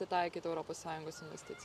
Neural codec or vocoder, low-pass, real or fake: none; 14.4 kHz; real